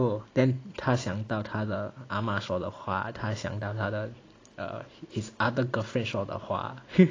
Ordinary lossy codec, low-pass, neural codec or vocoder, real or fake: AAC, 32 kbps; 7.2 kHz; none; real